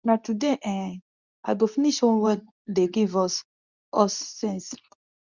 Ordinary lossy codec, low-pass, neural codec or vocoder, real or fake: none; 7.2 kHz; codec, 24 kHz, 0.9 kbps, WavTokenizer, medium speech release version 2; fake